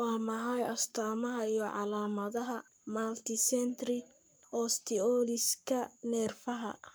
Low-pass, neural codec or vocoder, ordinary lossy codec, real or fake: none; codec, 44.1 kHz, 7.8 kbps, Pupu-Codec; none; fake